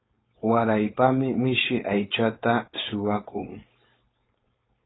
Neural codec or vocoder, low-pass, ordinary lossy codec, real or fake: codec, 16 kHz, 4.8 kbps, FACodec; 7.2 kHz; AAC, 16 kbps; fake